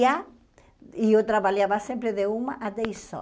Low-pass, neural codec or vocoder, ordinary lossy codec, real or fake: none; none; none; real